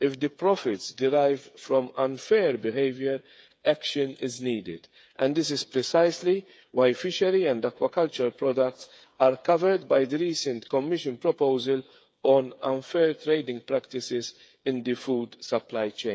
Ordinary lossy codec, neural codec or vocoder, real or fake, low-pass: none; codec, 16 kHz, 8 kbps, FreqCodec, smaller model; fake; none